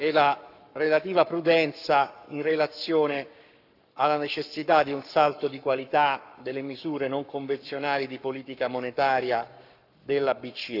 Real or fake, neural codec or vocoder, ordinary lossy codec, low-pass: fake; codec, 44.1 kHz, 7.8 kbps, Pupu-Codec; none; 5.4 kHz